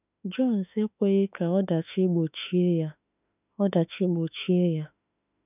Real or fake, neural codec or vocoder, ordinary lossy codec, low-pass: fake; autoencoder, 48 kHz, 32 numbers a frame, DAC-VAE, trained on Japanese speech; none; 3.6 kHz